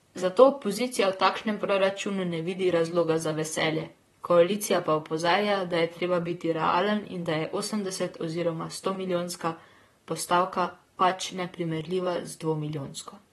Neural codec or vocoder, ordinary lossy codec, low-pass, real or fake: vocoder, 44.1 kHz, 128 mel bands, Pupu-Vocoder; AAC, 32 kbps; 19.8 kHz; fake